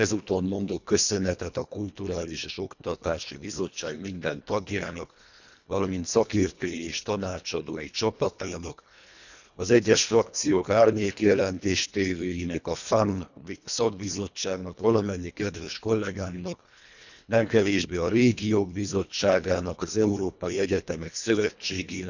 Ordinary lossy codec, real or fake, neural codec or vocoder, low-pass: none; fake; codec, 24 kHz, 1.5 kbps, HILCodec; 7.2 kHz